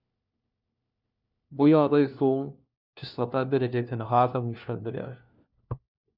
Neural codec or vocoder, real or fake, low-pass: codec, 16 kHz, 1 kbps, FunCodec, trained on LibriTTS, 50 frames a second; fake; 5.4 kHz